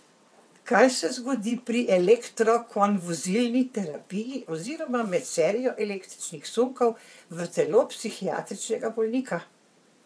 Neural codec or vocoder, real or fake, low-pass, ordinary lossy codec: vocoder, 22.05 kHz, 80 mel bands, WaveNeXt; fake; none; none